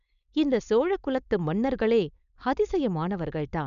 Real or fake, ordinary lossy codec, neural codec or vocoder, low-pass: fake; none; codec, 16 kHz, 4.8 kbps, FACodec; 7.2 kHz